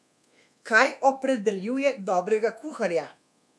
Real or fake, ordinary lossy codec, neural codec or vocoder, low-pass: fake; none; codec, 24 kHz, 1.2 kbps, DualCodec; none